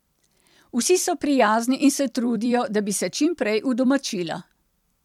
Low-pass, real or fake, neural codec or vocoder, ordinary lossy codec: 19.8 kHz; fake; vocoder, 44.1 kHz, 128 mel bands every 256 samples, BigVGAN v2; MP3, 96 kbps